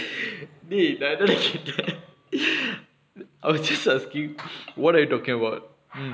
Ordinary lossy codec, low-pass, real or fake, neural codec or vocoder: none; none; real; none